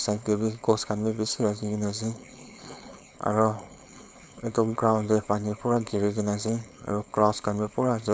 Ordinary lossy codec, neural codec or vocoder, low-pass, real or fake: none; codec, 16 kHz, 4.8 kbps, FACodec; none; fake